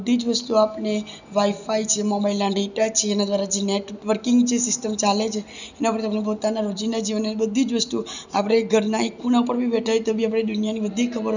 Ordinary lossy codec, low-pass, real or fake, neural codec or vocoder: none; 7.2 kHz; real; none